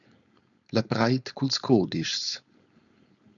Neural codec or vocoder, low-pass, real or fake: codec, 16 kHz, 4.8 kbps, FACodec; 7.2 kHz; fake